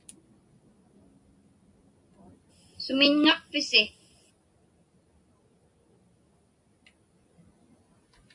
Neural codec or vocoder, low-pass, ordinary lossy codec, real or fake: none; 10.8 kHz; MP3, 96 kbps; real